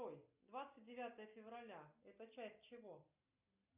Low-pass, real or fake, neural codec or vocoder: 3.6 kHz; real; none